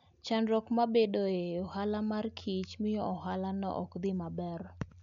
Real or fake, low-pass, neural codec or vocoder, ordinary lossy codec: real; 7.2 kHz; none; none